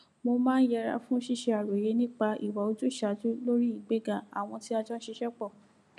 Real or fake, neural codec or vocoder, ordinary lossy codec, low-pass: real; none; none; none